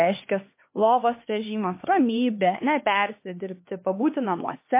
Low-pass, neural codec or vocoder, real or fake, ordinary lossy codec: 3.6 kHz; codec, 16 kHz, 2 kbps, X-Codec, HuBERT features, trained on LibriSpeech; fake; MP3, 24 kbps